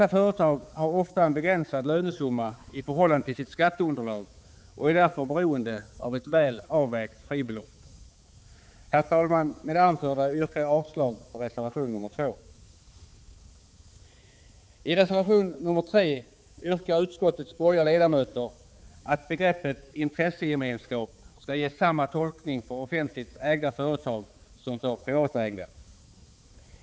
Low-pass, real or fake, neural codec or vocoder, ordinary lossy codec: none; fake; codec, 16 kHz, 4 kbps, X-Codec, HuBERT features, trained on balanced general audio; none